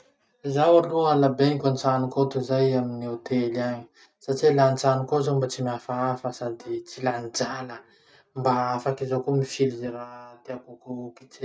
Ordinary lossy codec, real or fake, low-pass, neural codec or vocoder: none; real; none; none